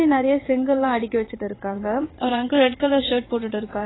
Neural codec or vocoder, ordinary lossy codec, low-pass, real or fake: vocoder, 22.05 kHz, 80 mel bands, WaveNeXt; AAC, 16 kbps; 7.2 kHz; fake